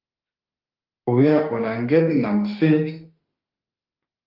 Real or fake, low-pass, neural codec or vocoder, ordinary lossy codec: fake; 5.4 kHz; autoencoder, 48 kHz, 32 numbers a frame, DAC-VAE, trained on Japanese speech; Opus, 32 kbps